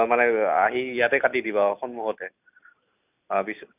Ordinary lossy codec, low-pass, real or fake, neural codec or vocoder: none; 3.6 kHz; real; none